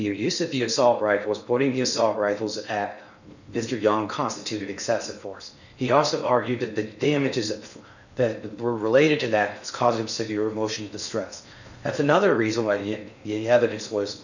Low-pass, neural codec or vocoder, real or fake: 7.2 kHz; codec, 16 kHz in and 24 kHz out, 0.6 kbps, FocalCodec, streaming, 4096 codes; fake